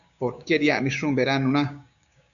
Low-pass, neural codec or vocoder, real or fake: 7.2 kHz; codec, 16 kHz, 6 kbps, DAC; fake